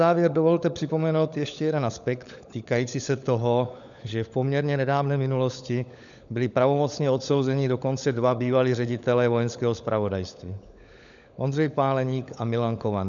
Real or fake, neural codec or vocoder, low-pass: fake; codec, 16 kHz, 16 kbps, FunCodec, trained on LibriTTS, 50 frames a second; 7.2 kHz